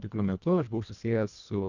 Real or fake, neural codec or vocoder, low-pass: fake; codec, 24 kHz, 1.5 kbps, HILCodec; 7.2 kHz